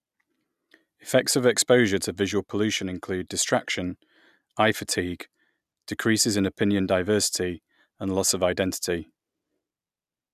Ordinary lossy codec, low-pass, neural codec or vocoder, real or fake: none; 14.4 kHz; none; real